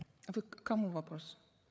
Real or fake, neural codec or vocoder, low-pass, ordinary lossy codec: fake; codec, 16 kHz, 8 kbps, FreqCodec, larger model; none; none